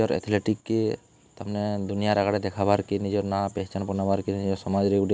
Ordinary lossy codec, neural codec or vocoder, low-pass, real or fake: none; none; none; real